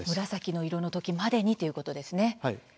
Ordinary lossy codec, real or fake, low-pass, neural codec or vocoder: none; real; none; none